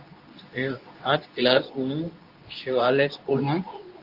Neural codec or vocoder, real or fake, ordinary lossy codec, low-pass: codec, 24 kHz, 0.9 kbps, WavTokenizer, medium speech release version 2; fake; Opus, 32 kbps; 5.4 kHz